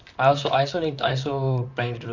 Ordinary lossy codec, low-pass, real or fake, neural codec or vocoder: none; 7.2 kHz; fake; vocoder, 44.1 kHz, 128 mel bands, Pupu-Vocoder